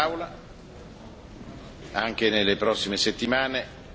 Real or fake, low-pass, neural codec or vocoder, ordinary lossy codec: real; none; none; none